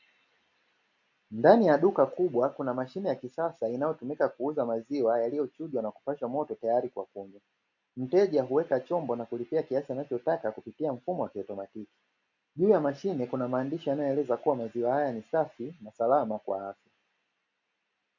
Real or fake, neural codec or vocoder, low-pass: real; none; 7.2 kHz